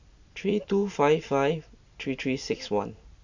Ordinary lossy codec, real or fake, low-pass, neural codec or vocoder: AAC, 48 kbps; real; 7.2 kHz; none